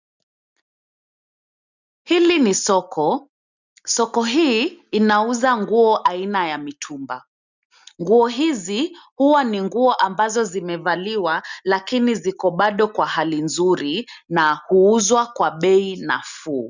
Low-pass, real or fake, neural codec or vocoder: 7.2 kHz; real; none